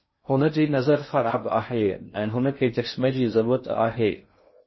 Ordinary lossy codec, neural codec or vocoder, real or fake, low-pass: MP3, 24 kbps; codec, 16 kHz in and 24 kHz out, 0.6 kbps, FocalCodec, streaming, 2048 codes; fake; 7.2 kHz